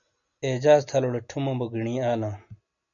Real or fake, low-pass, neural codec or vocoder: real; 7.2 kHz; none